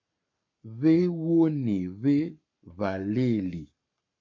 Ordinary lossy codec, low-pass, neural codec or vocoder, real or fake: MP3, 48 kbps; 7.2 kHz; codec, 44.1 kHz, 7.8 kbps, Pupu-Codec; fake